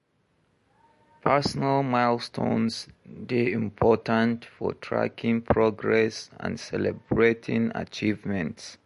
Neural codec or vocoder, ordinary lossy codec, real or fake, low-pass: none; MP3, 48 kbps; real; 14.4 kHz